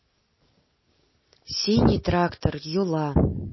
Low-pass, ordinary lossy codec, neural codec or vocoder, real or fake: 7.2 kHz; MP3, 24 kbps; none; real